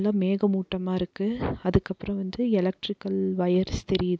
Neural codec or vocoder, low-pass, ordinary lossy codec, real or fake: none; none; none; real